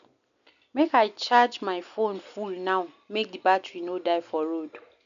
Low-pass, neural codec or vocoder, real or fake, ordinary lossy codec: 7.2 kHz; none; real; none